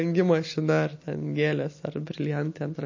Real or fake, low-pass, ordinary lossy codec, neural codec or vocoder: real; 7.2 kHz; MP3, 32 kbps; none